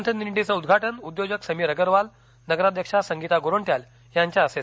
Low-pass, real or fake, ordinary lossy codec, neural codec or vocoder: none; real; none; none